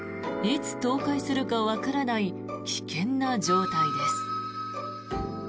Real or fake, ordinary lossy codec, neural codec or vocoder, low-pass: real; none; none; none